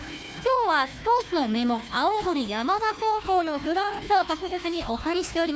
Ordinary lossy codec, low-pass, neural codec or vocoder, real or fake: none; none; codec, 16 kHz, 1 kbps, FunCodec, trained on Chinese and English, 50 frames a second; fake